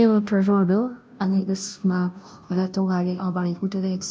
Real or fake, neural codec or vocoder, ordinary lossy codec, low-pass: fake; codec, 16 kHz, 0.5 kbps, FunCodec, trained on Chinese and English, 25 frames a second; none; none